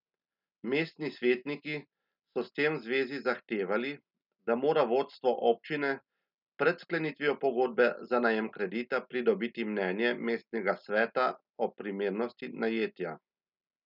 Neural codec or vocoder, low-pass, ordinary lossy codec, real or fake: none; 5.4 kHz; none; real